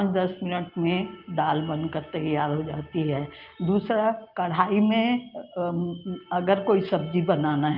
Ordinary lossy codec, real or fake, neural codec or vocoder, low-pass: Opus, 32 kbps; real; none; 5.4 kHz